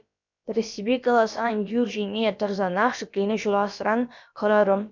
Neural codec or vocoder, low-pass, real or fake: codec, 16 kHz, about 1 kbps, DyCAST, with the encoder's durations; 7.2 kHz; fake